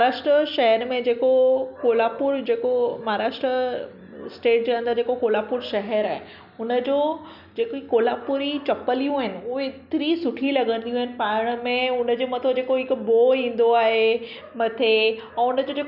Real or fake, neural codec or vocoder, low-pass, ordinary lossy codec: real; none; 5.4 kHz; none